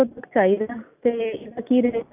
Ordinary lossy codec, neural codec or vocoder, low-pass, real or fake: none; none; 3.6 kHz; real